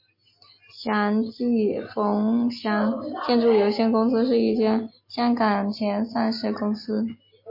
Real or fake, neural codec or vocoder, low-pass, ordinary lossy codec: real; none; 5.4 kHz; MP3, 32 kbps